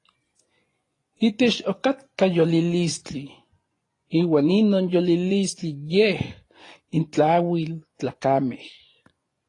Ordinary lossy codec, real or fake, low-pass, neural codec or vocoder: AAC, 32 kbps; fake; 10.8 kHz; vocoder, 24 kHz, 100 mel bands, Vocos